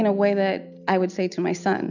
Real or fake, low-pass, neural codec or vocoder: real; 7.2 kHz; none